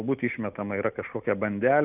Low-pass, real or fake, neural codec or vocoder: 3.6 kHz; real; none